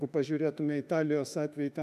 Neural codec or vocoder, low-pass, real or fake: autoencoder, 48 kHz, 32 numbers a frame, DAC-VAE, trained on Japanese speech; 14.4 kHz; fake